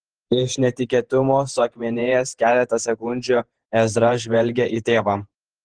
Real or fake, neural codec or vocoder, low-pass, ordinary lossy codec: fake; vocoder, 48 kHz, 128 mel bands, Vocos; 9.9 kHz; Opus, 16 kbps